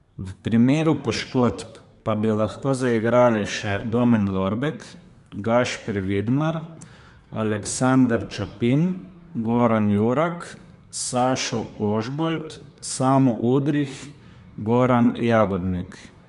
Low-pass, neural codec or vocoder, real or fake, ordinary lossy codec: 10.8 kHz; codec, 24 kHz, 1 kbps, SNAC; fake; none